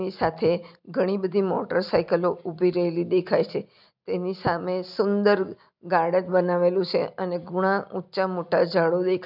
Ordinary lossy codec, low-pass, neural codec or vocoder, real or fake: none; 5.4 kHz; none; real